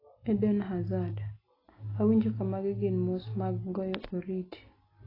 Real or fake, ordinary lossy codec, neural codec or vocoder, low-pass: real; AAC, 24 kbps; none; 5.4 kHz